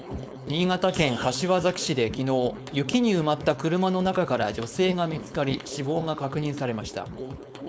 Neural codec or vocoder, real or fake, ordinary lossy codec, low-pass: codec, 16 kHz, 4.8 kbps, FACodec; fake; none; none